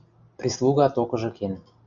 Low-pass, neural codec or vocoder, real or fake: 7.2 kHz; none; real